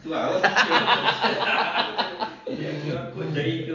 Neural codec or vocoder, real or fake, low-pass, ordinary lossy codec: none; real; 7.2 kHz; none